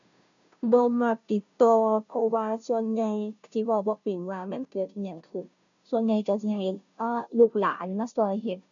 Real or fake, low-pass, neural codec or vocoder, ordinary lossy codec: fake; 7.2 kHz; codec, 16 kHz, 0.5 kbps, FunCodec, trained on Chinese and English, 25 frames a second; none